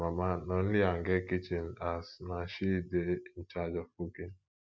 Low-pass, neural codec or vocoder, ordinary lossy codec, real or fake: none; none; none; real